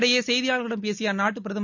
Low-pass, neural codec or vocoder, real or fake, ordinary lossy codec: 7.2 kHz; none; real; none